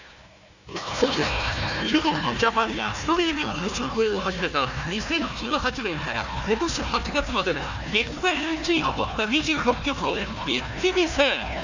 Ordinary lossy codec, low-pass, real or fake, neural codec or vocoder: none; 7.2 kHz; fake; codec, 16 kHz, 1 kbps, FunCodec, trained on Chinese and English, 50 frames a second